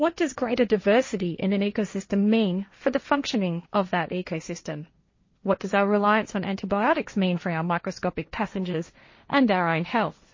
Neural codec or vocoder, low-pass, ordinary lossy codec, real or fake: codec, 16 kHz, 1.1 kbps, Voila-Tokenizer; 7.2 kHz; MP3, 32 kbps; fake